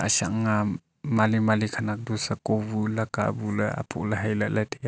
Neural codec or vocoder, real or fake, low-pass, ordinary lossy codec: none; real; none; none